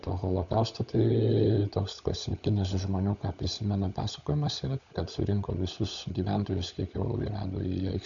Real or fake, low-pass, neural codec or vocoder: fake; 7.2 kHz; codec, 16 kHz, 16 kbps, FunCodec, trained on LibriTTS, 50 frames a second